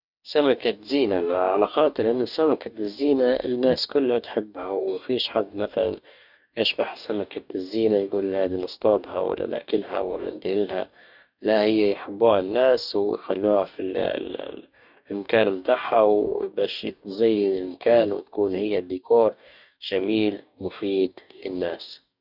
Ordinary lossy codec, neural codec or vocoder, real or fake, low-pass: none; codec, 44.1 kHz, 2.6 kbps, DAC; fake; 5.4 kHz